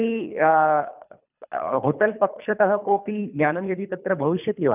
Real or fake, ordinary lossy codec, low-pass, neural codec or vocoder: fake; none; 3.6 kHz; codec, 24 kHz, 3 kbps, HILCodec